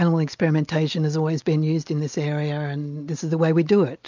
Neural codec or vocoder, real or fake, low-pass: none; real; 7.2 kHz